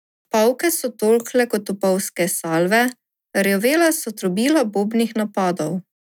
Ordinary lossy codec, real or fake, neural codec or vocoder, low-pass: none; real; none; 19.8 kHz